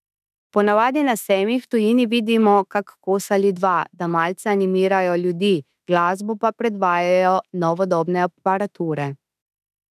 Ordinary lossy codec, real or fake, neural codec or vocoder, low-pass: none; fake; autoencoder, 48 kHz, 32 numbers a frame, DAC-VAE, trained on Japanese speech; 14.4 kHz